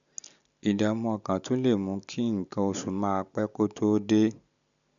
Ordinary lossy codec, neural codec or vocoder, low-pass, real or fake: none; none; 7.2 kHz; real